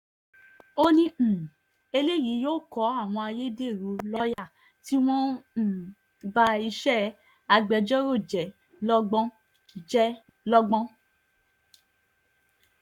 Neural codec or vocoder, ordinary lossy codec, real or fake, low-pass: codec, 44.1 kHz, 7.8 kbps, Pupu-Codec; none; fake; 19.8 kHz